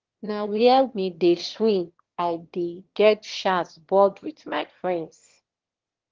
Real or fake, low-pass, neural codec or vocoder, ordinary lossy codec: fake; 7.2 kHz; autoencoder, 22.05 kHz, a latent of 192 numbers a frame, VITS, trained on one speaker; Opus, 16 kbps